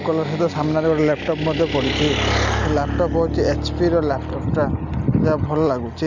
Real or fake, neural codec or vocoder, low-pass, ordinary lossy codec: real; none; 7.2 kHz; none